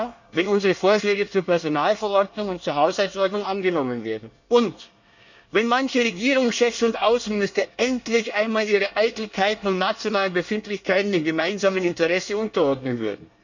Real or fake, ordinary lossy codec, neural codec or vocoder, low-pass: fake; none; codec, 24 kHz, 1 kbps, SNAC; 7.2 kHz